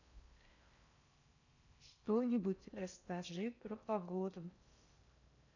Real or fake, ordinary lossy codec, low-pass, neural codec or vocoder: fake; none; 7.2 kHz; codec, 16 kHz in and 24 kHz out, 0.6 kbps, FocalCodec, streaming, 4096 codes